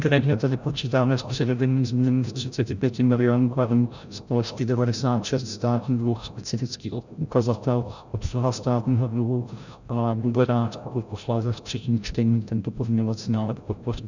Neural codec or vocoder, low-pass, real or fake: codec, 16 kHz, 0.5 kbps, FreqCodec, larger model; 7.2 kHz; fake